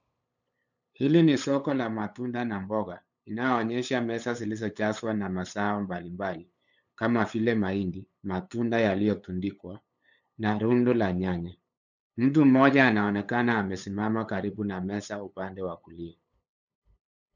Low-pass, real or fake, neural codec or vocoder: 7.2 kHz; fake; codec, 16 kHz, 8 kbps, FunCodec, trained on LibriTTS, 25 frames a second